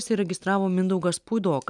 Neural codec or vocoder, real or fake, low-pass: none; real; 10.8 kHz